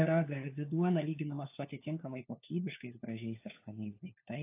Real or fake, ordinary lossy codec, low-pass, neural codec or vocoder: fake; AAC, 24 kbps; 3.6 kHz; codec, 16 kHz, 4 kbps, FunCodec, trained on LibriTTS, 50 frames a second